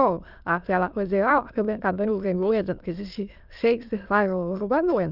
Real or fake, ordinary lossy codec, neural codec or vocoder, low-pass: fake; Opus, 24 kbps; autoencoder, 22.05 kHz, a latent of 192 numbers a frame, VITS, trained on many speakers; 5.4 kHz